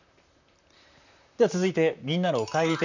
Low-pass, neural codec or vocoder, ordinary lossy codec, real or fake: 7.2 kHz; vocoder, 44.1 kHz, 128 mel bands, Pupu-Vocoder; none; fake